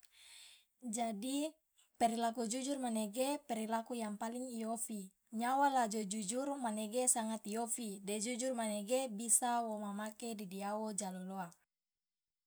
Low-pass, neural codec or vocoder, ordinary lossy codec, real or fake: none; none; none; real